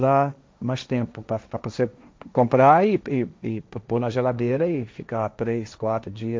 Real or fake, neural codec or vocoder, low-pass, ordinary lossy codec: fake; codec, 16 kHz, 1.1 kbps, Voila-Tokenizer; none; none